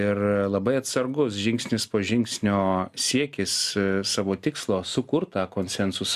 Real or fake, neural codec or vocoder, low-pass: real; none; 14.4 kHz